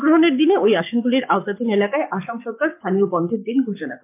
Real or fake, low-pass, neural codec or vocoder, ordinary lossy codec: fake; 3.6 kHz; codec, 44.1 kHz, 7.8 kbps, Pupu-Codec; MP3, 32 kbps